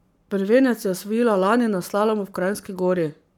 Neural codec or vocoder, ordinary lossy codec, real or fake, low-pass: codec, 44.1 kHz, 7.8 kbps, Pupu-Codec; none; fake; 19.8 kHz